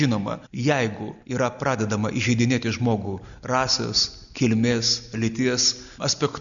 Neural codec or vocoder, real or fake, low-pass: none; real; 7.2 kHz